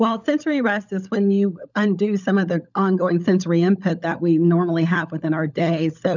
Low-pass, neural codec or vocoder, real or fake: 7.2 kHz; codec, 16 kHz, 16 kbps, FunCodec, trained on LibriTTS, 50 frames a second; fake